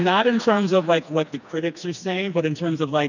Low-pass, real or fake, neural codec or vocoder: 7.2 kHz; fake; codec, 16 kHz, 2 kbps, FreqCodec, smaller model